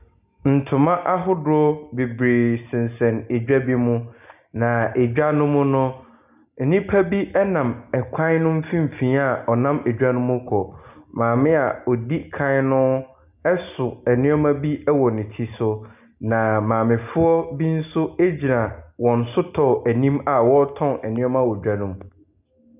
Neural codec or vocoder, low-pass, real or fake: none; 3.6 kHz; real